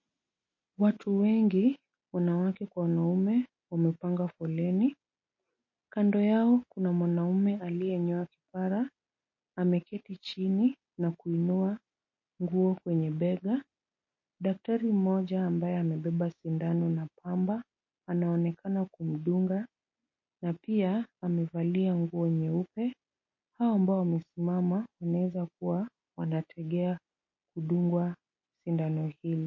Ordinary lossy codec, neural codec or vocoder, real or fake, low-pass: MP3, 32 kbps; none; real; 7.2 kHz